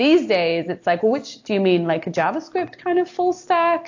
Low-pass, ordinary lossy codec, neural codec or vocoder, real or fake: 7.2 kHz; AAC, 48 kbps; none; real